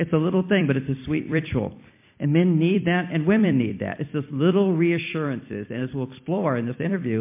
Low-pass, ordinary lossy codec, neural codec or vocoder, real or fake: 3.6 kHz; MP3, 24 kbps; vocoder, 44.1 kHz, 128 mel bands every 256 samples, BigVGAN v2; fake